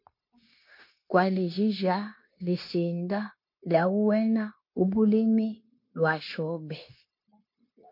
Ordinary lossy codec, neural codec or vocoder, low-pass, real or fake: MP3, 32 kbps; codec, 16 kHz, 0.9 kbps, LongCat-Audio-Codec; 5.4 kHz; fake